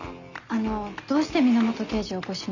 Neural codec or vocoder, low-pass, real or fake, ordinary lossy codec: none; 7.2 kHz; real; none